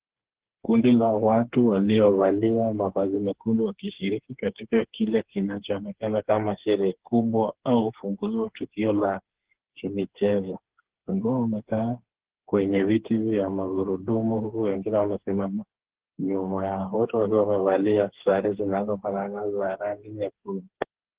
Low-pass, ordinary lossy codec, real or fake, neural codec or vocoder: 3.6 kHz; Opus, 16 kbps; fake; codec, 16 kHz, 4 kbps, FreqCodec, smaller model